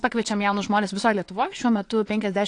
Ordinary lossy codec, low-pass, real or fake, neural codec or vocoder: AAC, 48 kbps; 9.9 kHz; fake; vocoder, 22.05 kHz, 80 mel bands, Vocos